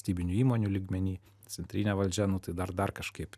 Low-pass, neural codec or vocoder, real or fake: 14.4 kHz; none; real